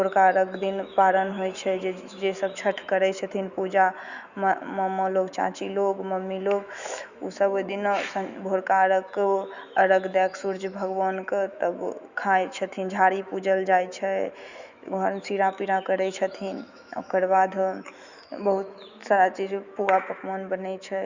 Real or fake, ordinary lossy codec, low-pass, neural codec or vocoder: fake; Opus, 64 kbps; 7.2 kHz; autoencoder, 48 kHz, 128 numbers a frame, DAC-VAE, trained on Japanese speech